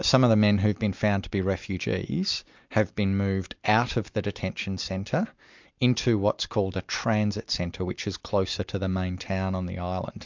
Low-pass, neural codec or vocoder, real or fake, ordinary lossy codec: 7.2 kHz; none; real; MP3, 64 kbps